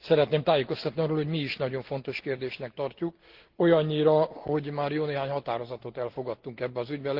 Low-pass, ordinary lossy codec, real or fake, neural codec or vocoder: 5.4 kHz; Opus, 16 kbps; real; none